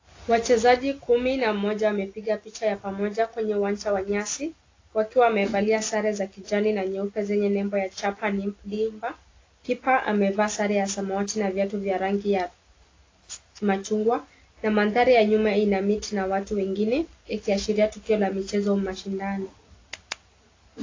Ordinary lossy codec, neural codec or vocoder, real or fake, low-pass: AAC, 32 kbps; none; real; 7.2 kHz